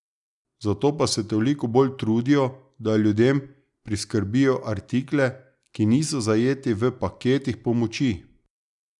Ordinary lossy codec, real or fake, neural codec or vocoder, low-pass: none; real; none; 10.8 kHz